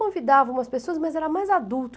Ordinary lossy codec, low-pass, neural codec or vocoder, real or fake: none; none; none; real